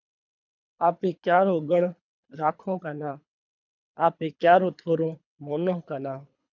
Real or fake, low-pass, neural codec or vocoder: fake; 7.2 kHz; codec, 24 kHz, 3 kbps, HILCodec